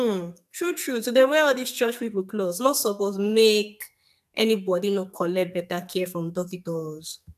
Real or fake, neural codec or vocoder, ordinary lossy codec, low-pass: fake; codec, 44.1 kHz, 2.6 kbps, SNAC; MP3, 96 kbps; 14.4 kHz